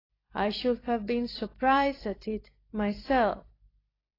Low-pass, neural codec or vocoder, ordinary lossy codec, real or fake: 5.4 kHz; vocoder, 44.1 kHz, 128 mel bands every 256 samples, BigVGAN v2; AAC, 24 kbps; fake